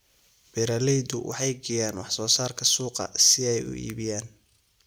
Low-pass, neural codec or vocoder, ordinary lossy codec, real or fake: none; none; none; real